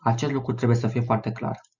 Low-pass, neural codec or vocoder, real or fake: 7.2 kHz; none; real